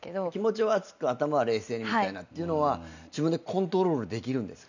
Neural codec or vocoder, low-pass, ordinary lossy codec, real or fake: none; 7.2 kHz; none; real